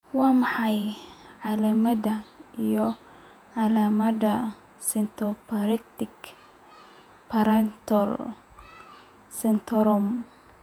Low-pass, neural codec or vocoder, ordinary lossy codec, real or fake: 19.8 kHz; vocoder, 48 kHz, 128 mel bands, Vocos; none; fake